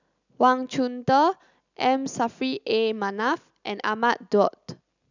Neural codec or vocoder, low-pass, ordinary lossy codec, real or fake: none; 7.2 kHz; none; real